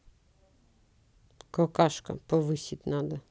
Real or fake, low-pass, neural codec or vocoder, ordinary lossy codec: real; none; none; none